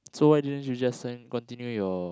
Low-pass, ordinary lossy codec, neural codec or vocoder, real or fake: none; none; none; real